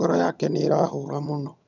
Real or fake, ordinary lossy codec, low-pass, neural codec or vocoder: fake; none; 7.2 kHz; vocoder, 22.05 kHz, 80 mel bands, HiFi-GAN